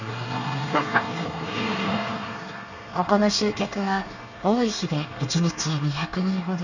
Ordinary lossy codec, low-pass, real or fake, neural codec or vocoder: none; 7.2 kHz; fake; codec, 24 kHz, 1 kbps, SNAC